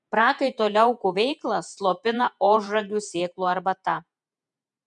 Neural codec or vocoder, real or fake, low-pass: vocoder, 48 kHz, 128 mel bands, Vocos; fake; 10.8 kHz